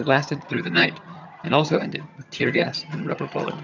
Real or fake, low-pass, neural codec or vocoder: fake; 7.2 kHz; vocoder, 22.05 kHz, 80 mel bands, HiFi-GAN